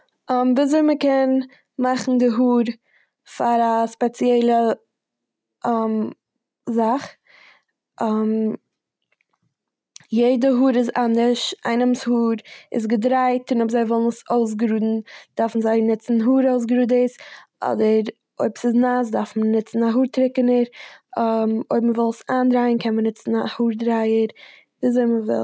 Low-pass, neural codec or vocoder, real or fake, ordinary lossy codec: none; none; real; none